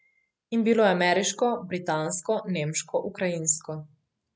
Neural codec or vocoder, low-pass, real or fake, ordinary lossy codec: none; none; real; none